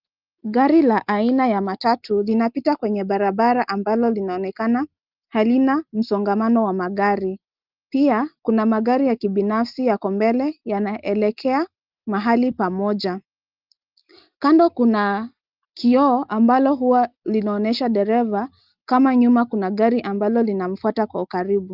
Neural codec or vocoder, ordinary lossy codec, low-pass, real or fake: none; Opus, 32 kbps; 5.4 kHz; real